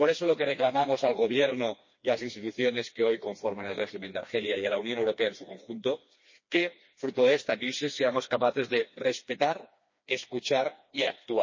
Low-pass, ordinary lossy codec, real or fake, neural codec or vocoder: 7.2 kHz; MP3, 32 kbps; fake; codec, 16 kHz, 2 kbps, FreqCodec, smaller model